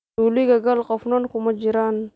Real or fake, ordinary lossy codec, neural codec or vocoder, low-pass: real; none; none; none